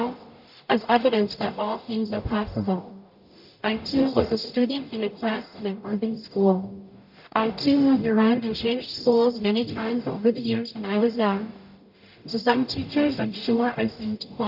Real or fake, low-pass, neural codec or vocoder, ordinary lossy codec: fake; 5.4 kHz; codec, 44.1 kHz, 0.9 kbps, DAC; AAC, 48 kbps